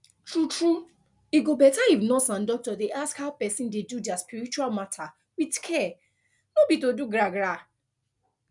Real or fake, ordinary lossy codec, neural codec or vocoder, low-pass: real; none; none; 10.8 kHz